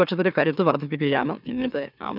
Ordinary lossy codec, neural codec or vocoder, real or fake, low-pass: none; autoencoder, 44.1 kHz, a latent of 192 numbers a frame, MeloTTS; fake; 5.4 kHz